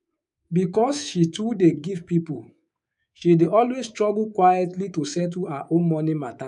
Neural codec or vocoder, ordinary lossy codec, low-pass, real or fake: codec, 24 kHz, 3.1 kbps, DualCodec; none; 10.8 kHz; fake